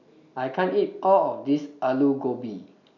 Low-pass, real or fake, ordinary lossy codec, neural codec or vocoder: 7.2 kHz; real; none; none